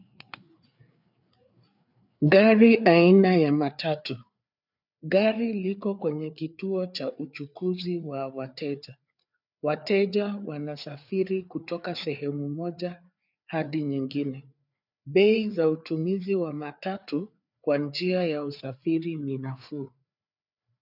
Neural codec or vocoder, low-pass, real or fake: codec, 16 kHz, 4 kbps, FreqCodec, larger model; 5.4 kHz; fake